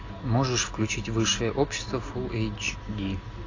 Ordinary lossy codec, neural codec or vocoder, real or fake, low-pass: AAC, 32 kbps; none; real; 7.2 kHz